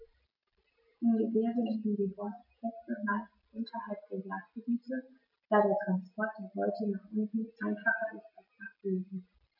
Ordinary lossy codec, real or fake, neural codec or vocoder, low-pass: none; real; none; 5.4 kHz